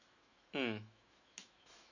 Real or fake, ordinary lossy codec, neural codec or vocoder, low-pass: fake; none; autoencoder, 48 kHz, 128 numbers a frame, DAC-VAE, trained on Japanese speech; 7.2 kHz